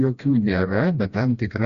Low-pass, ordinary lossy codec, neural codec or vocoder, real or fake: 7.2 kHz; Opus, 64 kbps; codec, 16 kHz, 1 kbps, FreqCodec, smaller model; fake